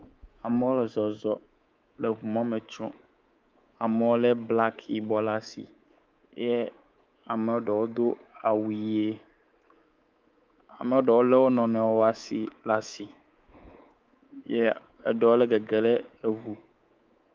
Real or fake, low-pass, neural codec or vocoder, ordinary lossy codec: fake; 7.2 kHz; codec, 24 kHz, 3.1 kbps, DualCodec; Opus, 32 kbps